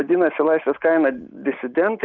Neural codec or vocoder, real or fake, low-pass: none; real; 7.2 kHz